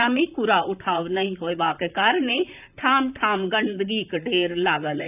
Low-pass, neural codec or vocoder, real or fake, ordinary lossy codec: 3.6 kHz; vocoder, 44.1 kHz, 128 mel bands, Pupu-Vocoder; fake; none